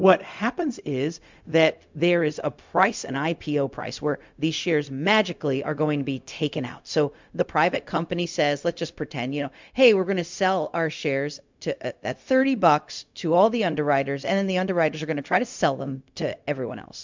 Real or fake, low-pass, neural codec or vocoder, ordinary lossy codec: fake; 7.2 kHz; codec, 16 kHz, 0.4 kbps, LongCat-Audio-Codec; MP3, 64 kbps